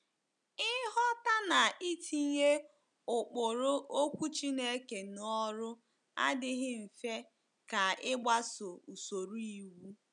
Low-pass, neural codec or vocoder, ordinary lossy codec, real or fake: 9.9 kHz; none; none; real